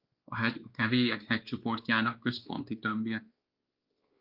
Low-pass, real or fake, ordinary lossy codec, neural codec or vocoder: 5.4 kHz; fake; Opus, 24 kbps; codec, 16 kHz, 2 kbps, X-Codec, WavLM features, trained on Multilingual LibriSpeech